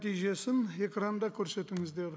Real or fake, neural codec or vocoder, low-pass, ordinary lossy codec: real; none; none; none